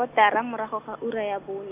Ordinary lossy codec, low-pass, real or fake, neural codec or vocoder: none; 3.6 kHz; real; none